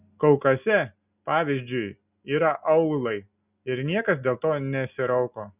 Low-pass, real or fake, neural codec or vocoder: 3.6 kHz; real; none